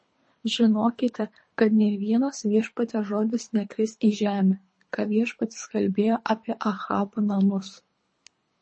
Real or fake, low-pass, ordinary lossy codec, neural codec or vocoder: fake; 9.9 kHz; MP3, 32 kbps; codec, 24 kHz, 3 kbps, HILCodec